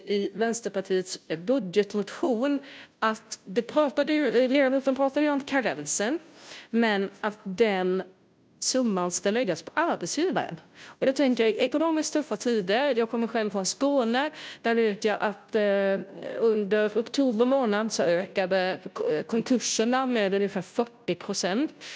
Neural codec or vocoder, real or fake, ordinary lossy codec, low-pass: codec, 16 kHz, 0.5 kbps, FunCodec, trained on Chinese and English, 25 frames a second; fake; none; none